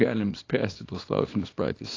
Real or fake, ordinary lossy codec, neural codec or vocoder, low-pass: fake; AAC, 32 kbps; codec, 24 kHz, 0.9 kbps, WavTokenizer, small release; 7.2 kHz